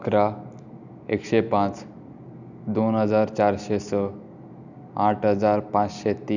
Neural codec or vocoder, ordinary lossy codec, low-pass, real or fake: none; none; 7.2 kHz; real